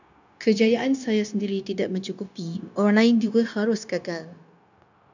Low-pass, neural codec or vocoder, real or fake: 7.2 kHz; codec, 16 kHz, 0.9 kbps, LongCat-Audio-Codec; fake